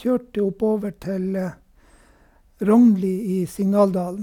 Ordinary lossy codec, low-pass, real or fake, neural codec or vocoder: none; 19.8 kHz; real; none